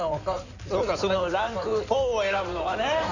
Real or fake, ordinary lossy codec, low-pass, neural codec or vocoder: fake; none; 7.2 kHz; codec, 16 kHz in and 24 kHz out, 2.2 kbps, FireRedTTS-2 codec